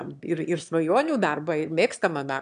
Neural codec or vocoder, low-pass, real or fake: autoencoder, 22.05 kHz, a latent of 192 numbers a frame, VITS, trained on one speaker; 9.9 kHz; fake